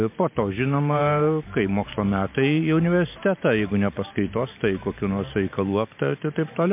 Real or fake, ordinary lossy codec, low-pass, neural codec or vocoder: fake; MP3, 32 kbps; 3.6 kHz; vocoder, 44.1 kHz, 128 mel bands every 512 samples, BigVGAN v2